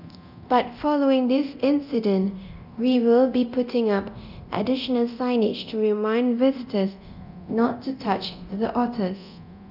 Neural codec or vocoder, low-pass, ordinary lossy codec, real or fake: codec, 24 kHz, 0.9 kbps, DualCodec; 5.4 kHz; none; fake